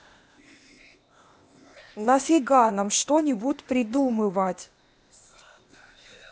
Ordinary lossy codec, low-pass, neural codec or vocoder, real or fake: none; none; codec, 16 kHz, 0.8 kbps, ZipCodec; fake